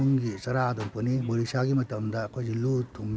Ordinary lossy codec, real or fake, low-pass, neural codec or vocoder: none; real; none; none